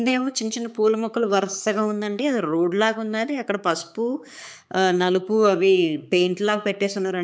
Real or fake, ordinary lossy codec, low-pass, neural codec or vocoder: fake; none; none; codec, 16 kHz, 4 kbps, X-Codec, HuBERT features, trained on balanced general audio